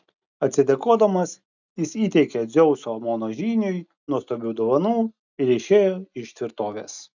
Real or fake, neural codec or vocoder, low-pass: real; none; 7.2 kHz